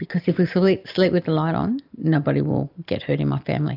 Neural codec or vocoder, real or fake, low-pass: none; real; 5.4 kHz